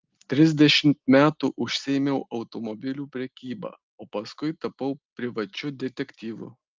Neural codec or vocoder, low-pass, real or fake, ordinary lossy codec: none; 7.2 kHz; real; Opus, 24 kbps